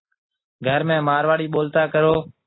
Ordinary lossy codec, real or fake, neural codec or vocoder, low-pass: AAC, 16 kbps; real; none; 7.2 kHz